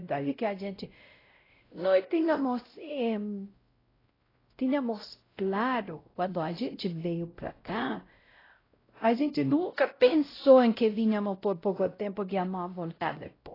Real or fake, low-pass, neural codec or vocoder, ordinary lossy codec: fake; 5.4 kHz; codec, 16 kHz, 0.5 kbps, X-Codec, WavLM features, trained on Multilingual LibriSpeech; AAC, 24 kbps